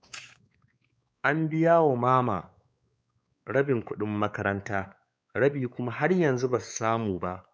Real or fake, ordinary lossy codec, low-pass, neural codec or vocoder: fake; none; none; codec, 16 kHz, 4 kbps, X-Codec, WavLM features, trained on Multilingual LibriSpeech